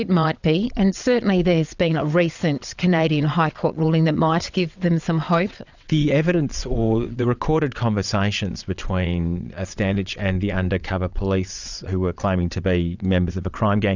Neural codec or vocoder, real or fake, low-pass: vocoder, 22.05 kHz, 80 mel bands, WaveNeXt; fake; 7.2 kHz